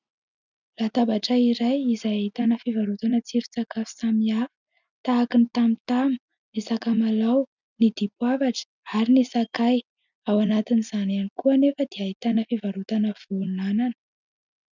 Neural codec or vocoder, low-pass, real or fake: none; 7.2 kHz; real